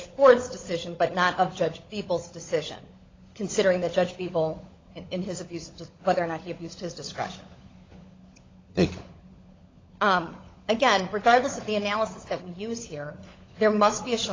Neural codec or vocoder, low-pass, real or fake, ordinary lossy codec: codec, 16 kHz, 16 kbps, FunCodec, trained on LibriTTS, 50 frames a second; 7.2 kHz; fake; AAC, 32 kbps